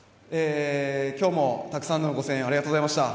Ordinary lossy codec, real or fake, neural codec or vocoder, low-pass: none; real; none; none